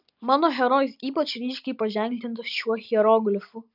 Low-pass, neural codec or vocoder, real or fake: 5.4 kHz; none; real